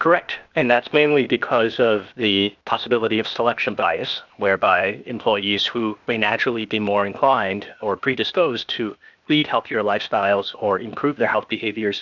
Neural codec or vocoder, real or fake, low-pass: codec, 16 kHz, 0.8 kbps, ZipCodec; fake; 7.2 kHz